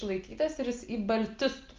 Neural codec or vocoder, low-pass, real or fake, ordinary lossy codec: none; 7.2 kHz; real; Opus, 24 kbps